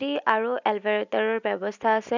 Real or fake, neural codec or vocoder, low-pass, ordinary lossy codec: real; none; 7.2 kHz; none